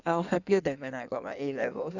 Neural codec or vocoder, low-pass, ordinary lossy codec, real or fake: codec, 16 kHz in and 24 kHz out, 1.1 kbps, FireRedTTS-2 codec; 7.2 kHz; none; fake